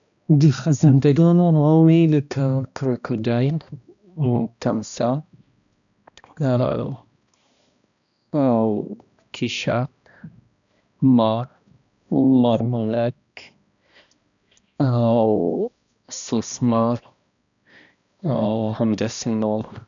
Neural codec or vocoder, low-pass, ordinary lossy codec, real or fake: codec, 16 kHz, 1 kbps, X-Codec, HuBERT features, trained on balanced general audio; 7.2 kHz; none; fake